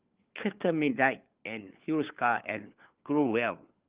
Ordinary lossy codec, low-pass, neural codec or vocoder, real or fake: Opus, 24 kbps; 3.6 kHz; codec, 16 kHz, 4 kbps, FunCodec, trained on LibriTTS, 50 frames a second; fake